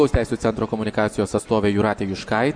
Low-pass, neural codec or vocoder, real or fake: 9.9 kHz; none; real